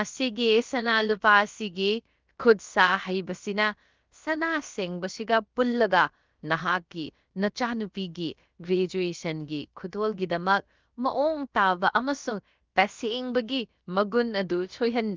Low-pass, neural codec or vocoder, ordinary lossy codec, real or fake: 7.2 kHz; codec, 16 kHz, about 1 kbps, DyCAST, with the encoder's durations; Opus, 32 kbps; fake